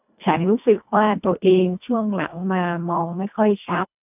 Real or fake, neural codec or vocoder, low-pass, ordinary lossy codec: fake; codec, 24 kHz, 1.5 kbps, HILCodec; 3.6 kHz; none